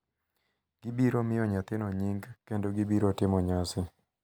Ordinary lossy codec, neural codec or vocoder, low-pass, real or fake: none; none; none; real